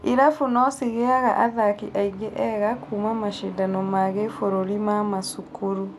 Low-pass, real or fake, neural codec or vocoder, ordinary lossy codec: 14.4 kHz; real; none; none